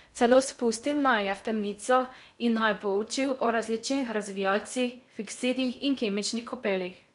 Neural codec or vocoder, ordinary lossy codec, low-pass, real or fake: codec, 16 kHz in and 24 kHz out, 0.6 kbps, FocalCodec, streaming, 2048 codes; none; 10.8 kHz; fake